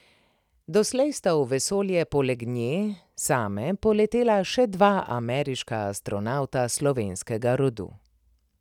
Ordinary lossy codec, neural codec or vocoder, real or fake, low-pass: none; none; real; 19.8 kHz